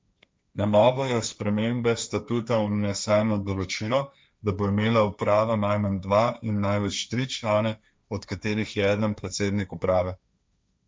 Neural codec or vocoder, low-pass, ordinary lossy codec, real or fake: codec, 16 kHz, 1.1 kbps, Voila-Tokenizer; none; none; fake